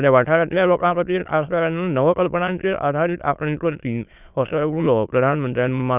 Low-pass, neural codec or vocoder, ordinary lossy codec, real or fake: 3.6 kHz; autoencoder, 22.05 kHz, a latent of 192 numbers a frame, VITS, trained on many speakers; none; fake